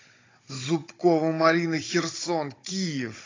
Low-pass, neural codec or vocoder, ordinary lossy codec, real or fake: 7.2 kHz; codec, 16 kHz, 16 kbps, FunCodec, trained on Chinese and English, 50 frames a second; AAC, 32 kbps; fake